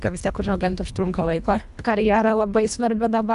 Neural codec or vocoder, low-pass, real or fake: codec, 24 kHz, 1.5 kbps, HILCodec; 10.8 kHz; fake